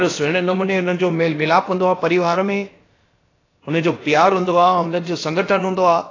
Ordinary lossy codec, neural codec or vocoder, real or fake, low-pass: AAC, 32 kbps; codec, 16 kHz, about 1 kbps, DyCAST, with the encoder's durations; fake; 7.2 kHz